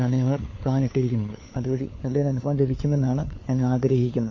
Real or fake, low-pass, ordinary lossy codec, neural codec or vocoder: fake; 7.2 kHz; MP3, 32 kbps; codec, 16 kHz, 4 kbps, FunCodec, trained on LibriTTS, 50 frames a second